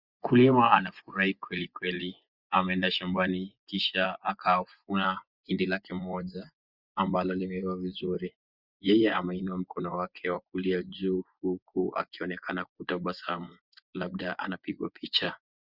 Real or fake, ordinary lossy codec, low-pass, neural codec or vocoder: fake; Opus, 64 kbps; 5.4 kHz; vocoder, 24 kHz, 100 mel bands, Vocos